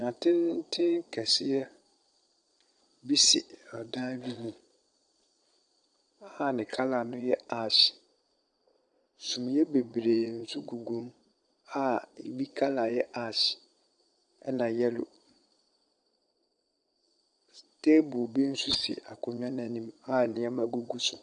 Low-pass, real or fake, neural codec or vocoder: 9.9 kHz; fake; vocoder, 22.05 kHz, 80 mel bands, Vocos